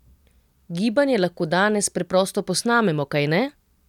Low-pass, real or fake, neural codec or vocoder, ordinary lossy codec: 19.8 kHz; real; none; none